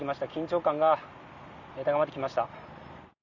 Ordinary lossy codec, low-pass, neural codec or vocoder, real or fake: Opus, 64 kbps; 7.2 kHz; none; real